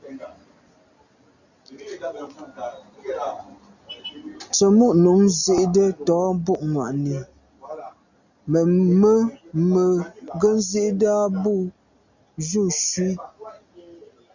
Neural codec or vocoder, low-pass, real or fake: none; 7.2 kHz; real